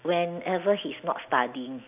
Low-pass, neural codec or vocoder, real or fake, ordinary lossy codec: 3.6 kHz; none; real; none